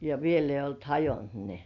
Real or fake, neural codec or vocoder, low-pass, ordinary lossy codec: real; none; 7.2 kHz; none